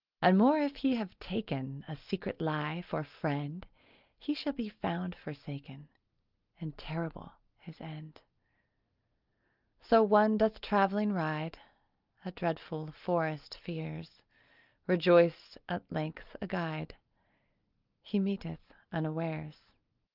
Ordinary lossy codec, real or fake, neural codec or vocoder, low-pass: Opus, 24 kbps; real; none; 5.4 kHz